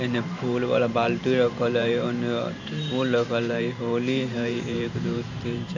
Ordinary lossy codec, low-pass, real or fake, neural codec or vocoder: none; 7.2 kHz; fake; vocoder, 44.1 kHz, 128 mel bands every 512 samples, BigVGAN v2